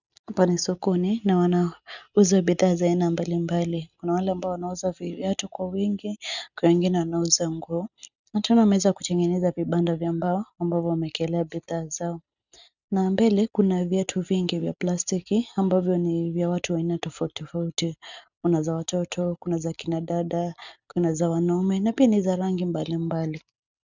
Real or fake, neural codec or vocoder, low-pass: real; none; 7.2 kHz